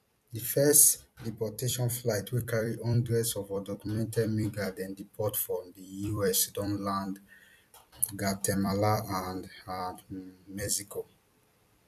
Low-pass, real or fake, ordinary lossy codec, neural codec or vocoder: 14.4 kHz; fake; none; vocoder, 44.1 kHz, 128 mel bands every 256 samples, BigVGAN v2